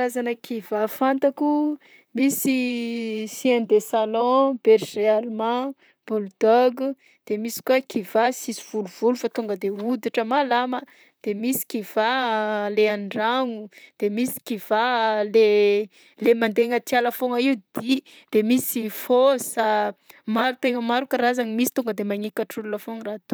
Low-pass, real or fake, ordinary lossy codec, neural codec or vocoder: none; fake; none; vocoder, 44.1 kHz, 128 mel bands, Pupu-Vocoder